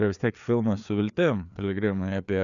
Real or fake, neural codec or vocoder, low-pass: fake; codec, 16 kHz, 4 kbps, FreqCodec, larger model; 7.2 kHz